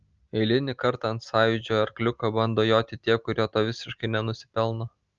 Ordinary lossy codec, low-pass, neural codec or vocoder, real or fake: Opus, 24 kbps; 7.2 kHz; none; real